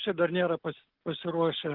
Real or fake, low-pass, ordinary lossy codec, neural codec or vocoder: real; 5.4 kHz; Opus, 32 kbps; none